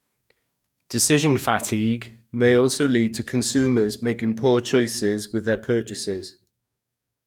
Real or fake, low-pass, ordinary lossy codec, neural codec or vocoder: fake; 19.8 kHz; none; codec, 44.1 kHz, 2.6 kbps, DAC